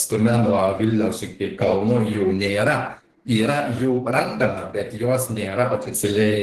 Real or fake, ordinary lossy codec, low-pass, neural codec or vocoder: fake; Opus, 16 kbps; 14.4 kHz; codec, 32 kHz, 1.9 kbps, SNAC